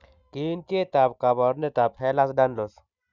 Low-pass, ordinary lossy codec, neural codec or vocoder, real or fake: 7.2 kHz; none; none; real